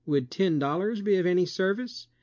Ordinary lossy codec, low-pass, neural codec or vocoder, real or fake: MP3, 48 kbps; 7.2 kHz; none; real